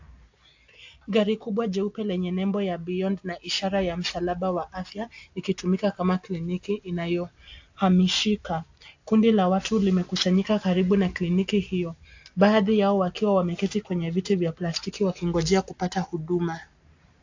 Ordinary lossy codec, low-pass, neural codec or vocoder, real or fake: AAC, 48 kbps; 7.2 kHz; none; real